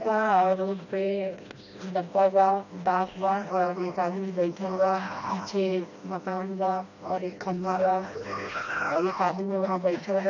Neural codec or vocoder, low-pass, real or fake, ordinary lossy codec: codec, 16 kHz, 1 kbps, FreqCodec, smaller model; 7.2 kHz; fake; none